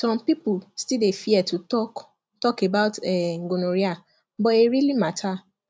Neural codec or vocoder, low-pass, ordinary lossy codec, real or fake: none; none; none; real